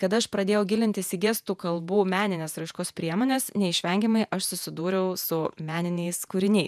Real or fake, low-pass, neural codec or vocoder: fake; 14.4 kHz; vocoder, 48 kHz, 128 mel bands, Vocos